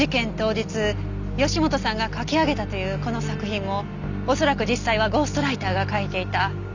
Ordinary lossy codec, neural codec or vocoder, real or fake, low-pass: none; none; real; 7.2 kHz